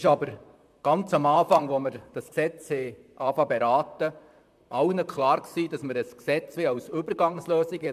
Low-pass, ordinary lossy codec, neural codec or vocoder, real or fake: 14.4 kHz; MP3, 96 kbps; vocoder, 44.1 kHz, 128 mel bands, Pupu-Vocoder; fake